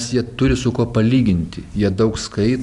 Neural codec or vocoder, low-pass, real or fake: none; 10.8 kHz; real